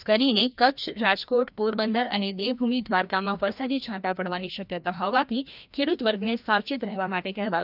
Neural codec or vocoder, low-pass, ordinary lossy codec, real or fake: codec, 16 kHz, 1 kbps, FreqCodec, larger model; 5.4 kHz; none; fake